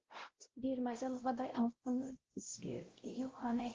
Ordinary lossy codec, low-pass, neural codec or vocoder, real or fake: Opus, 16 kbps; 7.2 kHz; codec, 16 kHz, 0.5 kbps, X-Codec, WavLM features, trained on Multilingual LibriSpeech; fake